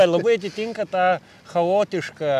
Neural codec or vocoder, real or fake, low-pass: none; real; 14.4 kHz